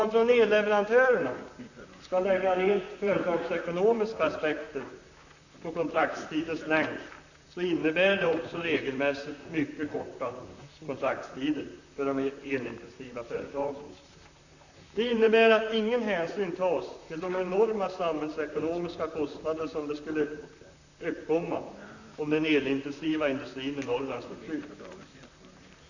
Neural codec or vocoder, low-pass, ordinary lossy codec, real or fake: vocoder, 44.1 kHz, 128 mel bands, Pupu-Vocoder; 7.2 kHz; none; fake